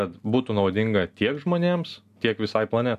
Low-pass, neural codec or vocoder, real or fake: 14.4 kHz; none; real